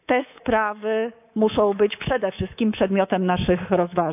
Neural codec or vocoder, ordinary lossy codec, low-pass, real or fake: codec, 24 kHz, 3.1 kbps, DualCodec; none; 3.6 kHz; fake